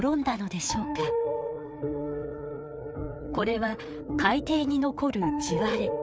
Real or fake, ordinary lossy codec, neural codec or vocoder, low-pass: fake; none; codec, 16 kHz, 4 kbps, FreqCodec, larger model; none